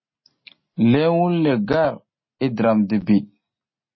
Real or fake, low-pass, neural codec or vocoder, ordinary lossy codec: real; 7.2 kHz; none; MP3, 24 kbps